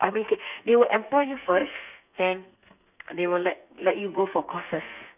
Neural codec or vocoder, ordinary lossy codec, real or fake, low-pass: codec, 32 kHz, 1.9 kbps, SNAC; none; fake; 3.6 kHz